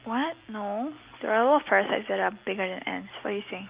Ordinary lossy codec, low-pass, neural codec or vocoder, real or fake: Opus, 32 kbps; 3.6 kHz; none; real